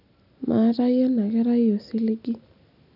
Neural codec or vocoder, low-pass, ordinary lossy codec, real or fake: none; 5.4 kHz; none; real